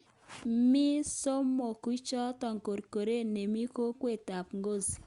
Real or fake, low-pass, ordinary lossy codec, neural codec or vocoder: real; 10.8 kHz; Opus, 64 kbps; none